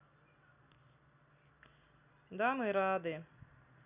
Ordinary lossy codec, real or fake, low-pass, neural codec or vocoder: none; real; 3.6 kHz; none